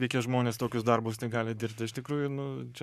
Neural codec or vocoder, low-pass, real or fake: codec, 44.1 kHz, 7.8 kbps, Pupu-Codec; 14.4 kHz; fake